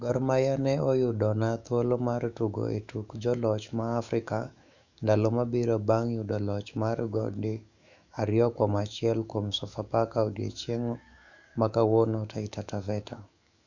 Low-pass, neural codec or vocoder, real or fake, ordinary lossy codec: 7.2 kHz; autoencoder, 48 kHz, 128 numbers a frame, DAC-VAE, trained on Japanese speech; fake; none